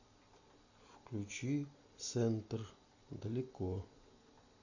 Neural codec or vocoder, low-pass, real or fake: none; 7.2 kHz; real